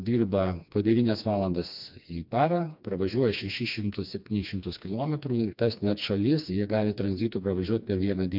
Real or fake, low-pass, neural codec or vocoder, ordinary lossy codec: fake; 5.4 kHz; codec, 16 kHz, 2 kbps, FreqCodec, smaller model; MP3, 48 kbps